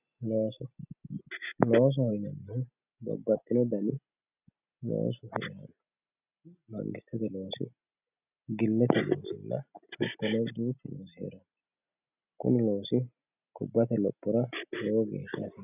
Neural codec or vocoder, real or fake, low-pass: none; real; 3.6 kHz